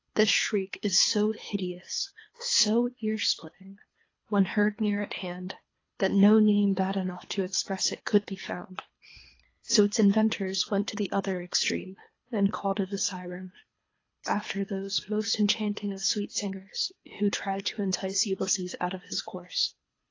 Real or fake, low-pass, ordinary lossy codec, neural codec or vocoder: fake; 7.2 kHz; AAC, 32 kbps; codec, 24 kHz, 3 kbps, HILCodec